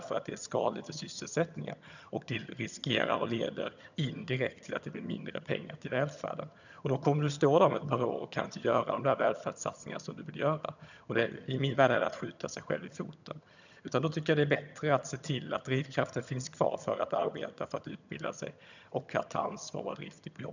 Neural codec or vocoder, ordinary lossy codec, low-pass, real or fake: vocoder, 22.05 kHz, 80 mel bands, HiFi-GAN; none; 7.2 kHz; fake